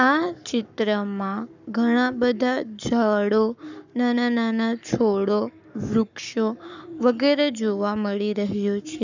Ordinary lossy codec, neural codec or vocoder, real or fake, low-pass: none; codec, 44.1 kHz, 7.8 kbps, Pupu-Codec; fake; 7.2 kHz